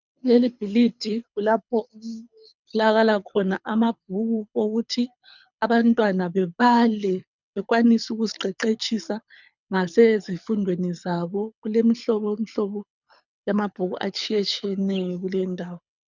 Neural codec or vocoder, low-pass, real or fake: codec, 24 kHz, 6 kbps, HILCodec; 7.2 kHz; fake